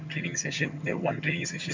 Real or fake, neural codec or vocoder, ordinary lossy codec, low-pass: fake; vocoder, 22.05 kHz, 80 mel bands, HiFi-GAN; MP3, 64 kbps; 7.2 kHz